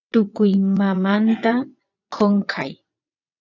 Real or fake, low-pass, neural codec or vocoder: fake; 7.2 kHz; vocoder, 22.05 kHz, 80 mel bands, WaveNeXt